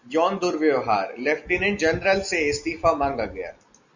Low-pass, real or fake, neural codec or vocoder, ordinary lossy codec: 7.2 kHz; real; none; Opus, 64 kbps